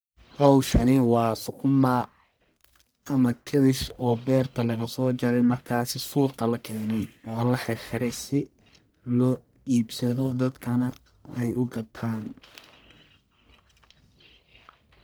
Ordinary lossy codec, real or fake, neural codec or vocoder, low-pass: none; fake; codec, 44.1 kHz, 1.7 kbps, Pupu-Codec; none